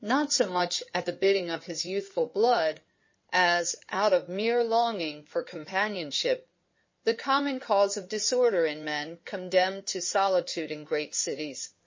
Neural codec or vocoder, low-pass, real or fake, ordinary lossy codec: codec, 16 kHz in and 24 kHz out, 2.2 kbps, FireRedTTS-2 codec; 7.2 kHz; fake; MP3, 32 kbps